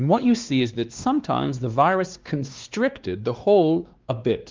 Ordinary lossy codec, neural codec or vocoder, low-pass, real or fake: Opus, 32 kbps; codec, 16 kHz, 2 kbps, X-Codec, WavLM features, trained on Multilingual LibriSpeech; 7.2 kHz; fake